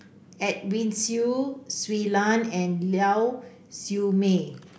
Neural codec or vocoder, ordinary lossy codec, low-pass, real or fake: none; none; none; real